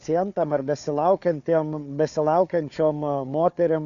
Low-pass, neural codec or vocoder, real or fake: 7.2 kHz; codec, 16 kHz, 4 kbps, FunCodec, trained on Chinese and English, 50 frames a second; fake